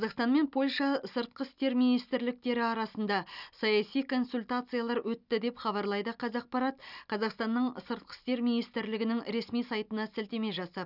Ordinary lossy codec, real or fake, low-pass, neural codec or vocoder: none; real; 5.4 kHz; none